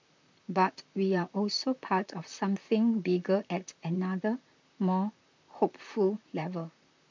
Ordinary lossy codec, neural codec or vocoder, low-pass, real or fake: MP3, 64 kbps; vocoder, 44.1 kHz, 128 mel bands, Pupu-Vocoder; 7.2 kHz; fake